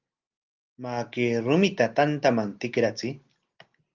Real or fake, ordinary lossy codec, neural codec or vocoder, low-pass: real; Opus, 24 kbps; none; 7.2 kHz